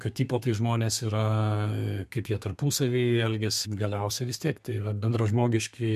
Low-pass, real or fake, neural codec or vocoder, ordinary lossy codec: 14.4 kHz; fake; codec, 32 kHz, 1.9 kbps, SNAC; MP3, 96 kbps